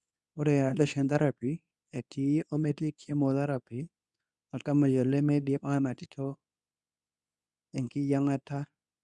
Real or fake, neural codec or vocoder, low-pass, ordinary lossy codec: fake; codec, 24 kHz, 0.9 kbps, WavTokenizer, medium speech release version 2; none; none